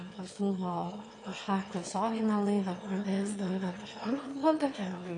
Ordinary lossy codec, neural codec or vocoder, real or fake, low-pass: AAC, 48 kbps; autoencoder, 22.05 kHz, a latent of 192 numbers a frame, VITS, trained on one speaker; fake; 9.9 kHz